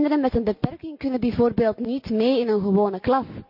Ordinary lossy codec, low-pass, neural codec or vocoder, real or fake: none; 5.4 kHz; none; real